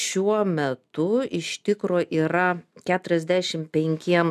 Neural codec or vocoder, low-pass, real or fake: none; 14.4 kHz; real